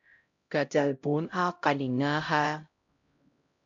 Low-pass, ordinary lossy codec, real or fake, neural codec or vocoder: 7.2 kHz; AAC, 64 kbps; fake; codec, 16 kHz, 0.5 kbps, X-Codec, HuBERT features, trained on LibriSpeech